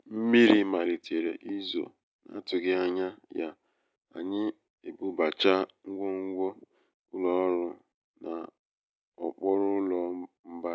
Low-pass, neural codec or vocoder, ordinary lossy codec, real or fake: none; none; none; real